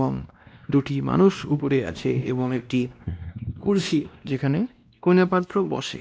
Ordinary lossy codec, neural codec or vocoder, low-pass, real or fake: none; codec, 16 kHz, 1 kbps, X-Codec, WavLM features, trained on Multilingual LibriSpeech; none; fake